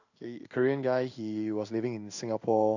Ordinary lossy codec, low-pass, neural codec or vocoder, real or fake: none; 7.2 kHz; codec, 16 kHz in and 24 kHz out, 1 kbps, XY-Tokenizer; fake